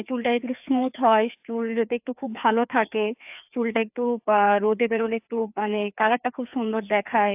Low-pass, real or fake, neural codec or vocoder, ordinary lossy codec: 3.6 kHz; fake; codec, 16 kHz, 2 kbps, FreqCodec, larger model; AAC, 32 kbps